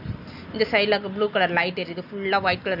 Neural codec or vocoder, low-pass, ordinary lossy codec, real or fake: none; 5.4 kHz; none; real